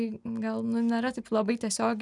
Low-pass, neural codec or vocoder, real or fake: 10.8 kHz; none; real